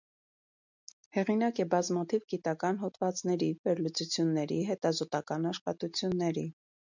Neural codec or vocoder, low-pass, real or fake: none; 7.2 kHz; real